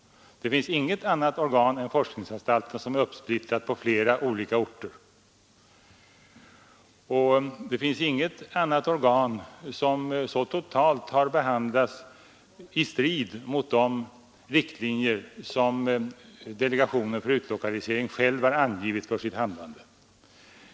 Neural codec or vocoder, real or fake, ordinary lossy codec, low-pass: none; real; none; none